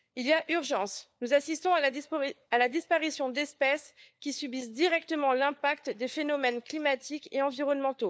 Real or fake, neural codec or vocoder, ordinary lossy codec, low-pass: fake; codec, 16 kHz, 4 kbps, FunCodec, trained on LibriTTS, 50 frames a second; none; none